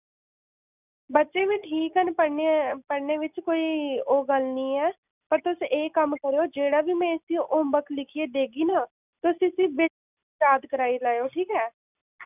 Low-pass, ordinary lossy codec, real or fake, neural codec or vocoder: 3.6 kHz; none; real; none